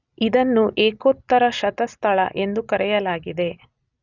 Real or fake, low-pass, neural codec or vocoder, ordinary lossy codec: real; 7.2 kHz; none; none